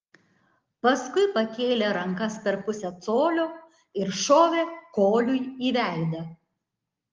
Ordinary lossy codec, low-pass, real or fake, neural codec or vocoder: Opus, 32 kbps; 7.2 kHz; real; none